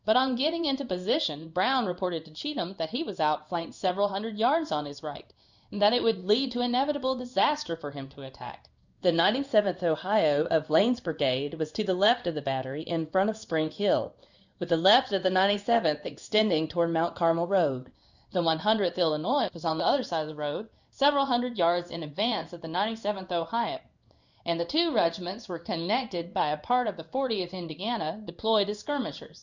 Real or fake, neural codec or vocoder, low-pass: fake; codec, 16 kHz in and 24 kHz out, 1 kbps, XY-Tokenizer; 7.2 kHz